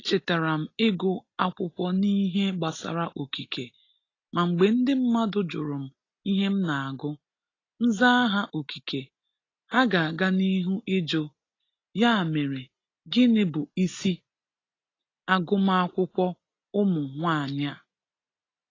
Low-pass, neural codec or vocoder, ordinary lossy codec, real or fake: 7.2 kHz; none; AAC, 32 kbps; real